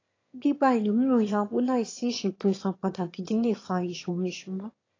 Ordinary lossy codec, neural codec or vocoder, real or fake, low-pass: AAC, 32 kbps; autoencoder, 22.05 kHz, a latent of 192 numbers a frame, VITS, trained on one speaker; fake; 7.2 kHz